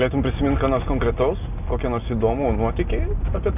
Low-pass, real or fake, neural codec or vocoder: 3.6 kHz; real; none